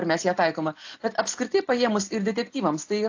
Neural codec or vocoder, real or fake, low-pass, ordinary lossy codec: none; real; 7.2 kHz; AAC, 48 kbps